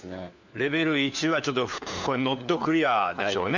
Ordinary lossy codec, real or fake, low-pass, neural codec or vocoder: none; fake; 7.2 kHz; codec, 16 kHz, 4 kbps, FunCodec, trained on LibriTTS, 50 frames a second